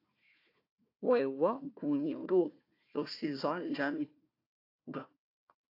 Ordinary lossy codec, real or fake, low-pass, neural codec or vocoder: AAC, 32 kbps; fake; 5.4 kHz; codec, 16 kHz, 1 kbps, FunCodec, trained on Chinese and English, 50 frames a second